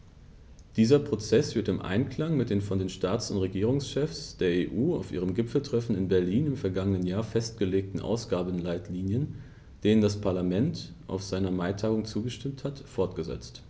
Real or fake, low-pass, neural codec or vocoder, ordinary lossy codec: real; none; none; none